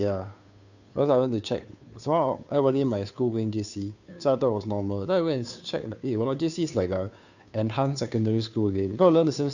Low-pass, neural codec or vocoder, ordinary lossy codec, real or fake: 7.2 kHz; codec, 16 kHz, 2 kbps, FunCodec, trained on Chinese and English, 25 frames a second; none; fake